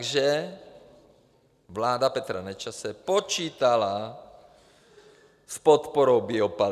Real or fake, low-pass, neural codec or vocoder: real; 14.4 kHz; none